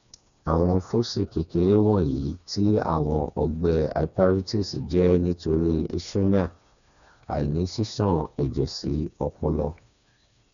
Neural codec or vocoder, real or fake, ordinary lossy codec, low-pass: codec, 16 kHz, 2 kbps, FreqCodec, smaller model; fake; none; 7.2 kHz